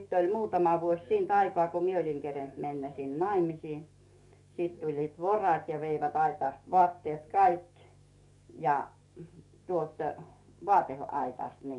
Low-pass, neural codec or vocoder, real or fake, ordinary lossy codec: 10.8 kHz; codec, 44.1 kHz, 7.8 kbps, DAC; fake; none